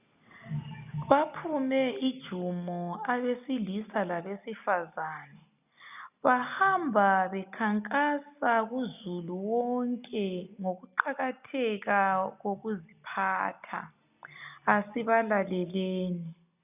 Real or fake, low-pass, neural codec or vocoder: real; 3.6 kHz; none